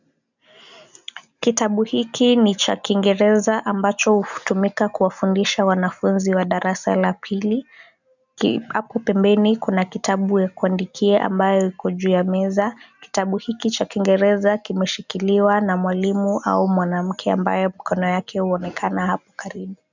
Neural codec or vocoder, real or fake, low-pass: none; real; 7.2 kHz